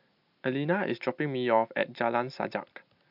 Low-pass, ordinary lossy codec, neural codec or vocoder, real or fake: 5.4 kHz; none; none; real